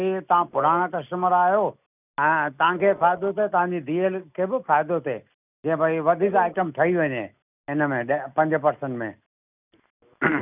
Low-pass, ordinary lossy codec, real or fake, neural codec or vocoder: 3.6 kHz; none; real; none